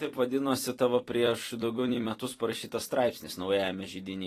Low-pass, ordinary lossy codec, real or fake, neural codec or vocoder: 14.4 kHz; AAC, 48 kbps; fake; vocoder, 44.1 kHz, 128 mel bands every 256 samples, BigVGAN v2